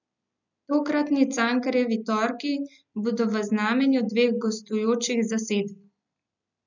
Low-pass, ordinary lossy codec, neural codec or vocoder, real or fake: 7.2 kHz; none; none; real